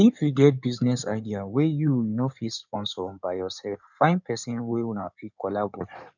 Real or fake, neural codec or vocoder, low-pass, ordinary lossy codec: fake; codec, 16 kHz in and 24 kHz out, 2.2 kbps, FireRedTTS-2 codec; 7.2 kHz; none